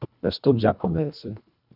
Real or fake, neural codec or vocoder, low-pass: fake; codec, 24 kHz, 1.5 kbps, HILCodec; 5.4 kHz